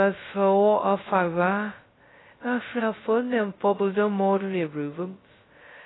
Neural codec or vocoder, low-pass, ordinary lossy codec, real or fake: codec, 16 kHz, 0.2 kbps, FocalCodec; 7.2 kHz; AAC, 16 kbps; fake